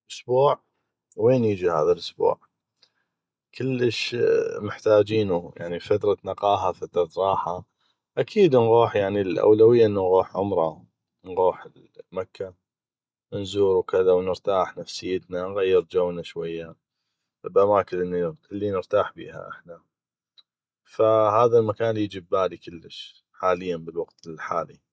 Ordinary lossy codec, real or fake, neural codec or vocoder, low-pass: none; real; none; none